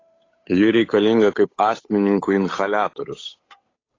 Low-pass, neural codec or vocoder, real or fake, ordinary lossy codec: 7.2 kHz; codec, 16 kHz, 8 kbps, FunCodec, trained on Chinese and English, 25 frames a second; fake; AAC, 32 kbps